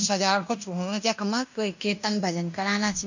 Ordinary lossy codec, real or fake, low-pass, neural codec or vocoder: none; fake; 7.2 kHz; codec, 16 kHz in and 24 kHz out, 0.9 kbps, LongCat-Audio-Codec, fine tuned four codebook decoder